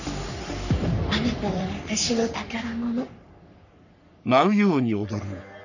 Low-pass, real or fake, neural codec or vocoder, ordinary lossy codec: 7.2 kHz; fake; codec, 44.1 kHz, 3.4 kbps, Pupu-Codec; none